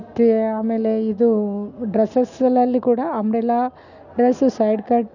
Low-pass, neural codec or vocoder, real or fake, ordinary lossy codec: 7.2 kHz; none; real; none